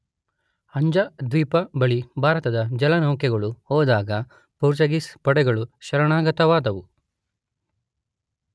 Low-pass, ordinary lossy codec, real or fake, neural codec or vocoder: none; none; real; none